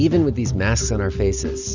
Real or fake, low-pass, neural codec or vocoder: real; 7.2 kHz; none